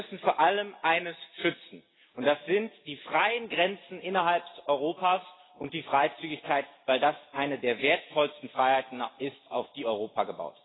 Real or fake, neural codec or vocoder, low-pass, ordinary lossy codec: real; none; 7.2 kHz; AAC, 16 kbps